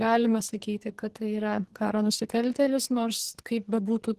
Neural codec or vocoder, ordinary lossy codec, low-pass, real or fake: codec, 44.1 kHz, 2.6 kbps, SNAC; Opus, 16 kbps; 14.4 kHz; fake